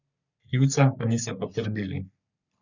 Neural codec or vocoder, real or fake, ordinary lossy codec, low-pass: codec, 44.1 kHz, 3.4 kbps, Pupu-Codec; fake; none; 7.2 kHz